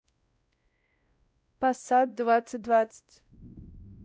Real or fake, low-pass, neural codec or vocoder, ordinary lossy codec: fake; none; codec, 16 kHz, 0.5 kbps, X-Codec, WavLM features, trained on Multilingual LibriSpeech; none